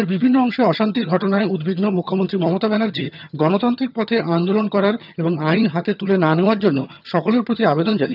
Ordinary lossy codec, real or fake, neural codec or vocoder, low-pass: none; fake; vocoder, 22.05 kHz, 80 mel bands, HiFi-GAN; 5.4 kHz